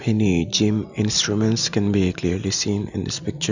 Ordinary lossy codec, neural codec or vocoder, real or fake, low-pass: none; none; real; 7.2 kHz